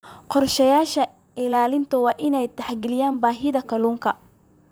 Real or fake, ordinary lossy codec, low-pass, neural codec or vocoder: fake; none; none; vocoder, 44.1 kHz, 128 mel bands, Pupu-Vocoder